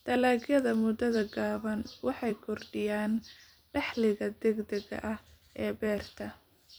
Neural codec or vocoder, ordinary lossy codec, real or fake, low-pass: vocoder, 44.1 kHz, 128 mel bands every 256 samples, BigVGAN v2; none; fake; none